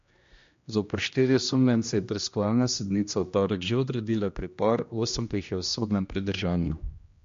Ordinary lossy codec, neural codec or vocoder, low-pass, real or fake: MP3, 48 kbps; codec, 16 kHz, 1 kbps, X-Codec, HuBERT features, trained on general audio; 7.2 kHz; fake